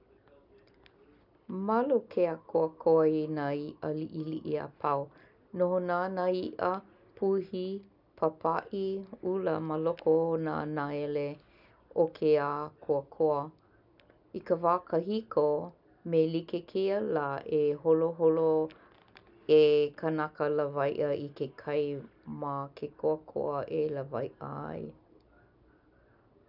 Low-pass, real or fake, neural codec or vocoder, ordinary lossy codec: 5.4 kHz; real; none; none